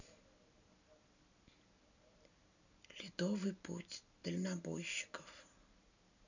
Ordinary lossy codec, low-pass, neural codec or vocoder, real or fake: none; 7.2 kHz; none; real